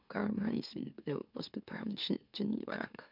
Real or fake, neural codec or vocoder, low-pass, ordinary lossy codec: fake; autoencoder, 44.1 kHz, a latent of 192 numbers a frame, MeloTTS; 5.4 kHz; none